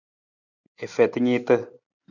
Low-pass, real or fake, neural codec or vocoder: 7.2 kHz; real; none